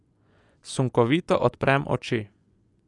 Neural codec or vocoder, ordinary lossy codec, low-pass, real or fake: vocoder, 24 kHz, 100 mel bands, Vocos; none; 10.8 kHz; fake